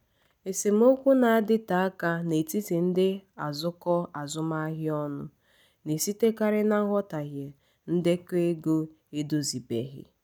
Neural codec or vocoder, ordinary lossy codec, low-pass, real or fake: none; none; none; real